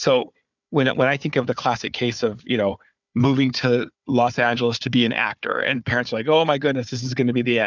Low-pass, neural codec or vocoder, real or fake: 7.2 kHz; codec, 16 kHz, 4 kbps, FunCodec, trained on Chinese and English, 50 frames a second; fake